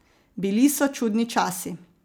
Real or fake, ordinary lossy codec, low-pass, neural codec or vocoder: real; none; none; none